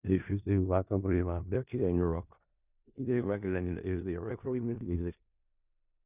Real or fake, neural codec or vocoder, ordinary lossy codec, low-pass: fake; codec, 16 kHz in and 24 kHz out, 0.4 kbps, LongCat-Audio-Codec, four codebook decoder; none; 3.6 kHz